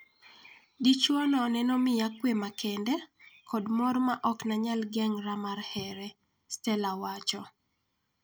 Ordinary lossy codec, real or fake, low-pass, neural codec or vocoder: none; real; none; none